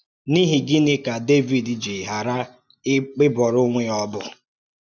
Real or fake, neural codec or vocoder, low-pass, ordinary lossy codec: real; none; 7.2 kHz; none